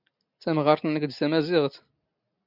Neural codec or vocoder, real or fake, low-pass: none; real; 5.4 kHz